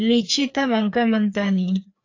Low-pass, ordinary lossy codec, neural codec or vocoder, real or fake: 7.2 kHz; AAC, 48 kbps; codec, 16 kHz, 2 kbps, FreqCodec, larger model; fake